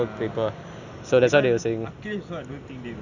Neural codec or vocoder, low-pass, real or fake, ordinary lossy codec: none; 7.2 kHz; real; none